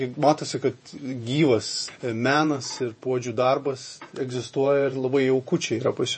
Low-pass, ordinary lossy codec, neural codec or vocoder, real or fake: 10.8 kHz; MP3, 32 kbps; vocoder, 48 kHz, 128 mel bands, Vocos; fake